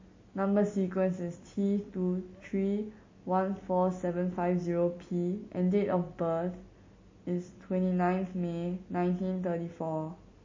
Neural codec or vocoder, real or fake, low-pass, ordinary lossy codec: none; real; 7.2 kHz; MP3, 32 kbps